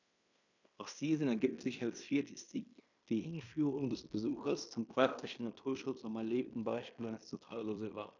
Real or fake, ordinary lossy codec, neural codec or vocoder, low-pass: fake; none; codec, 16 kHz in and 24 kHz out, 0.9 kbps, LongCat-Audio-Codec, fine tuned four codebook decoder; 7.2 kHz